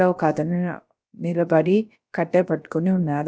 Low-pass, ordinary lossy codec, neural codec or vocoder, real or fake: none; none; codec, 16 kHz, about 1 kbps, DyCAST, with the encoder's durations; fake